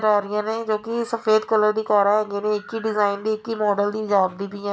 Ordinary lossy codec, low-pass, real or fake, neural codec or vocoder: none; none; real; none